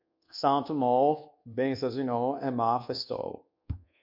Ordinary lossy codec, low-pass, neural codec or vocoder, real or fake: MP3, 48 kbps; 5.4 kHz; codec, 24 kHz, 1.2 kbps, DualCodec; fake